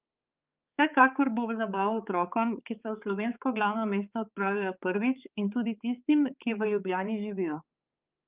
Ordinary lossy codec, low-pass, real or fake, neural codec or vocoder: Opus, 24 kbps; 3.6 kHz; fake; codec, 16 kHz, 4 kbps, X-Codec, HuBERT features, trained on balanced general audio